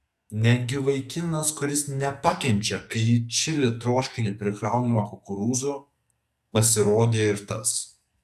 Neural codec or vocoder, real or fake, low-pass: codec, 44.1 kHz, 2.6 kbps, SNAC; fake; 14.4 kHz